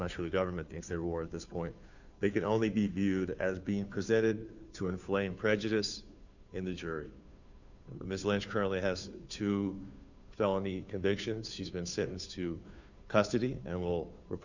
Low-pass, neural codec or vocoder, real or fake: 7.2 kHz; codec, 16 kHz, 2 kbps, FunCodec, trained on Chinese and English, 25 frames a second; fake